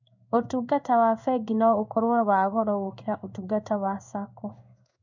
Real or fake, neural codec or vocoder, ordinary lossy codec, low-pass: fake; codec, 16 kHz in and 24 kHz out, 1 kbps, XY-Tokenizer; none; 7.2 kHz